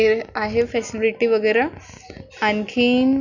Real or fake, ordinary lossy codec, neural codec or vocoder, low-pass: real; none; none; 7.2 kHz